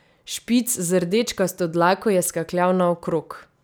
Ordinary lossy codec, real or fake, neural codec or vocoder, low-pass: none; real; none; none